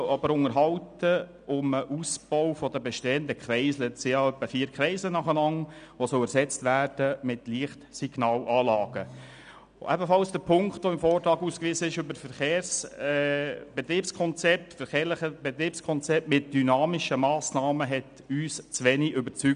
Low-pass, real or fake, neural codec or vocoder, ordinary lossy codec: 9.9 kHz; real; none; none